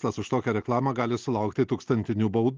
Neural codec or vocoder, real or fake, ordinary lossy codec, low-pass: none; real; Opus, 24 kbps; 7.2 kHz